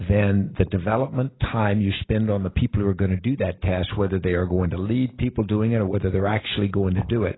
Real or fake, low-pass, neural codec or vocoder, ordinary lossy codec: real; 7.2 kHz; none; AAC, 16 kbps